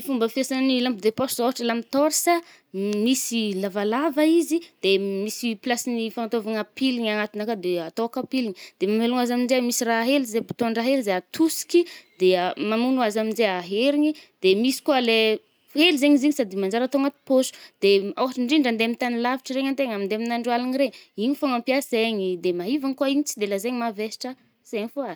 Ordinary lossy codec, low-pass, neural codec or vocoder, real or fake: none; none; none; real